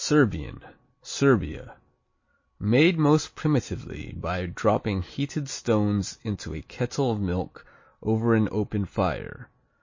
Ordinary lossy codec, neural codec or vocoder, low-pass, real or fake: MP3, 32 kbps; none; 7.2 kHz; real